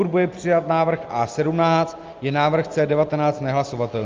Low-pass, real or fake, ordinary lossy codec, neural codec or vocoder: 7.2 kHz; real; Opus, 24 kbps; none